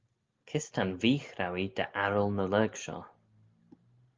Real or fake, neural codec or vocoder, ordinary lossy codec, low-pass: real; none; Opus, 32 kbps; 7.2 kHz